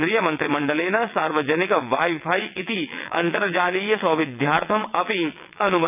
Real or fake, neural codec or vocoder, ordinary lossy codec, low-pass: fake; vocoder, 22.05 kHz, 80 mel bands, WaveNeXt; none; 3.6 kHz